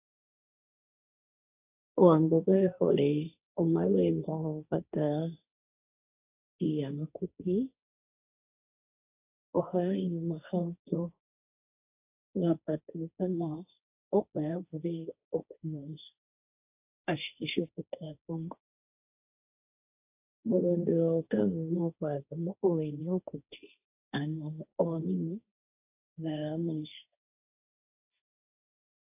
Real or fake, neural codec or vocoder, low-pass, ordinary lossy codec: fake; codec, 16 kHz, 1.1 kbps, Voila-Tokenizer; 3.6 kHz; AAC, 32 kbps